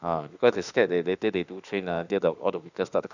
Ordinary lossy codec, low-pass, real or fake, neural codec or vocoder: none; 7.2 kHz; fake; autoencoder, 48 kHz, 32 numbers a frame, DAC-VAE, trained on Japanese speech